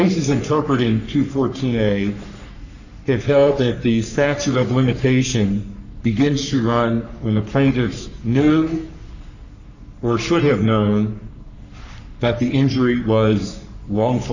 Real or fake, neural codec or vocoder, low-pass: fake; codec, 44.1 kHz, 3.4 kbps, Pupu-Codec; 7.2 kHz